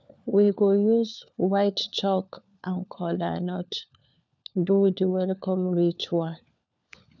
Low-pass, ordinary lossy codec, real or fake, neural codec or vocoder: none; none; fake; codec, 16 kHz, 4 kbps, FunCodec, trained on LibriTTS, 50 frames a second